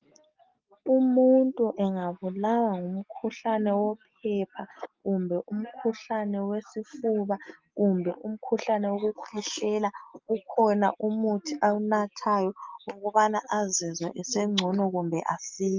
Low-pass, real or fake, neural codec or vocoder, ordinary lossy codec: 7.2 kHz; real; none; Opus, 24 kbps